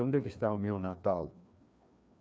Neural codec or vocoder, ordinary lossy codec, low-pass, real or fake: codec, 16 kHz, 2 kbps, FreqCodec, larger model; none; none; fake